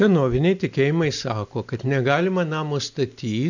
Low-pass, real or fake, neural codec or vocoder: 7.2 kHz; real; none